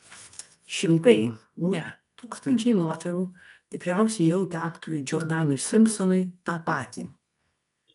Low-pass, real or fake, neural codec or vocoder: 10.8 kHz; fake; codec, 24 kHz, 0.9 kbps, WavTokenizer, medium music audio release